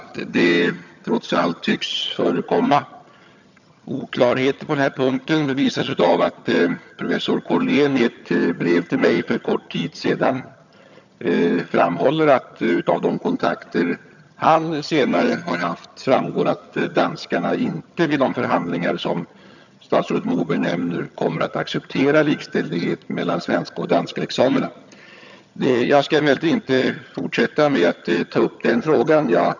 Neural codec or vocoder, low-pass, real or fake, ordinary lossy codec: vocoder, 22.05 kHz, 80 mel bands, HiFi-GAN; 7.2 kHz; fake; none